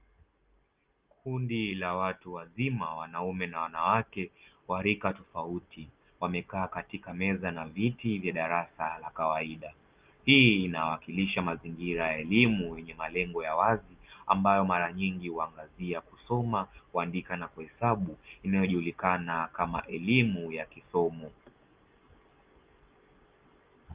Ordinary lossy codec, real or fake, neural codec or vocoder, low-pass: Opus, 32 kbps; real; none; 3.6 kHz